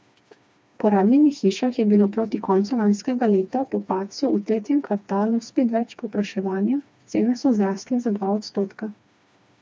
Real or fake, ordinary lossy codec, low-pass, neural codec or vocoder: fake; none; none; codec, 16 kHz, 2 kbps, FreqCodec, smaller model